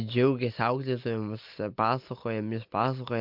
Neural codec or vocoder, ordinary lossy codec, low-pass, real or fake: none; MP3, 48 kbps; 5.4 kHz; real